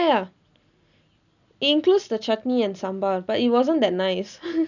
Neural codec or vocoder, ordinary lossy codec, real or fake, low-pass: none; none; real; 7.2 kHz